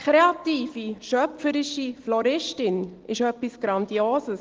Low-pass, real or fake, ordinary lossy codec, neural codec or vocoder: 7.2 kHz; real; Opus, 16 kbps; none